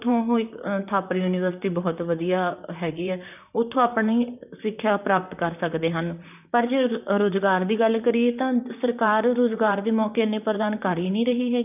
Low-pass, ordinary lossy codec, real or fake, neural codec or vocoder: 3.6 kHz; none; fake; vocoder, 44.1 kHz, 128 mel bands, Pupu-Vocoder